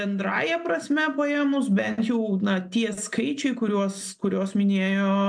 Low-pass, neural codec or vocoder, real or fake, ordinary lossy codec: 9.9 kHz; none; real; MP3, 64 kbps